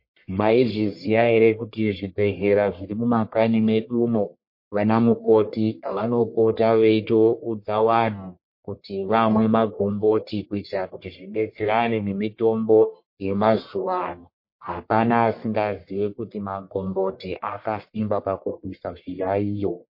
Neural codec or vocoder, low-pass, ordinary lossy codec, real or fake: codec, 44.1 kHz, 1.7 kbps, Pupu-Codec; 5.4 kHz; MP3, 32 kbps; fake